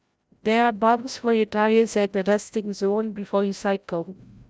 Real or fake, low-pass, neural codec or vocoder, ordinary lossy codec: fake; none; codec, 16 kHz, 0.5 kbps, FreqCodec, larger model; none